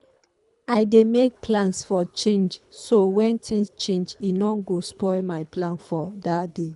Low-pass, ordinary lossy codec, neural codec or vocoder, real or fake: 10.8 kHz; none; codec, 24 kHz, 3 kbps, HILCodec; fake